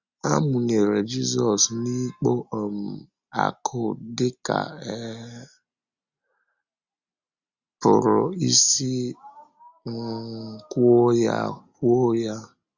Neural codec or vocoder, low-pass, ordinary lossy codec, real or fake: none; 7.2 kHz; Opus, 64 kbps; real